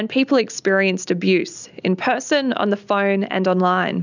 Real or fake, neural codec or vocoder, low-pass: real; none; 7.2 kHz